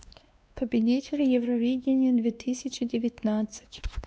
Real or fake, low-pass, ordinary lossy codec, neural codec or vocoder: fake; none; none; codec, 16 kHz, 2 kbps, X-Codec, WavLM features, trained on Multilingual LibriSpeech